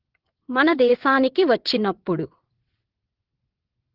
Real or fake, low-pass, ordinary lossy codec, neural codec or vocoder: fake; 5.4 kHz; Opus, 16 kbps; vocoder, 22.05 kHz, 80 mel bands, WaveNeXt